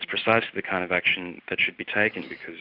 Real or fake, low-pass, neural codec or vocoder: real; 5.4 kHz; none